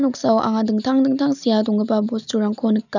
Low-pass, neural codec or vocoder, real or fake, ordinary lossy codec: 7.2 kHz; none; real; none